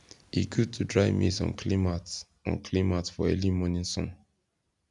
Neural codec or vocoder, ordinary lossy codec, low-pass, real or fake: none; none; 10.8 kHz; real